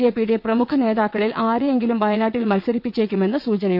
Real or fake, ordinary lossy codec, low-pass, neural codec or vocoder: fake; none; 5.4 kHz; vocoder, 22.05 kHz, 80 mel bands, WaveNeXt